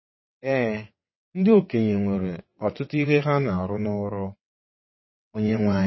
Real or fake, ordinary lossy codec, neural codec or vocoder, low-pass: fake; MP3, 24 kbps; vocoder, 22.05 kHz, 80 mel bands, WaveNeXt; 7.2 kHz